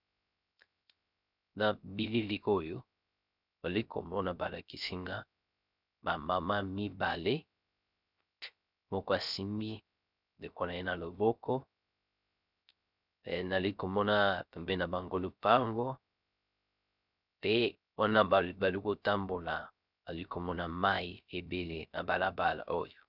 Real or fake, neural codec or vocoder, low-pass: fake; codec, 16 kHz, 0.3 kbps, FocalCodec; 5.4 kHz